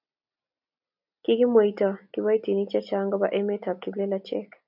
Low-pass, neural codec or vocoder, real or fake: 5.4 kHz; none; real